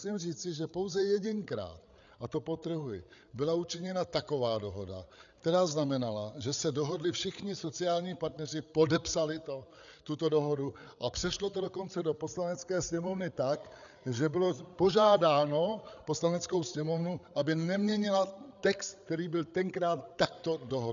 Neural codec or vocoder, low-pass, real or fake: codec, 16 kHz, 8 kbps, FreqCodec, larger model; 7.2 kHz; fake